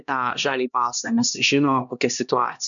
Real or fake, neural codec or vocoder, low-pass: fake; codec, 16 kHz, 1 kbps, X-Codec, HuBERT features, trained on LibriSpeech; 7.2 kHz